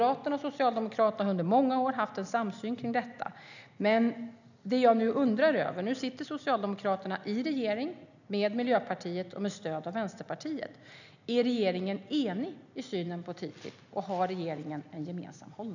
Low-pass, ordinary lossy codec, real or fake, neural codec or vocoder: 7.2 kHz; none; real; none